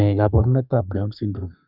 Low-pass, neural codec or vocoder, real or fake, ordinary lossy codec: 5.4 kHz; codec, 32 kHz, 1.9 kbps, SNAC; fake; none